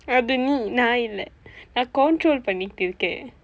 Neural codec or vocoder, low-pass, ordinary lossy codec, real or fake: none; none; none; real